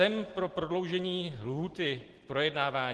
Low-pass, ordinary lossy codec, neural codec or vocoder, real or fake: 10.8 kHz; Opus, 16 kbps; none; real